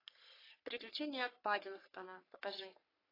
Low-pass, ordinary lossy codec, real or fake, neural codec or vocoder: 5.4 kHz; AAC, 24 kbps; fake; codec, 44.1 kHz, 3.4 kbps, Pupu-Codec